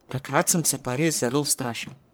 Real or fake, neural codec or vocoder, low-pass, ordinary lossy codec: fake; codec, 44.1 kHz, 1.7 kbps, Pupu-Codec; none; none